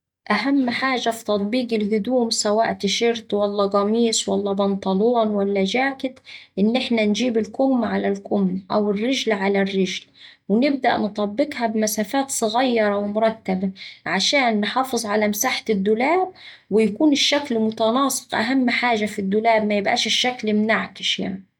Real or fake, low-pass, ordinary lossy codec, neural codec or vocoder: real; 19.8 kHz; MP3, 96 kbps; none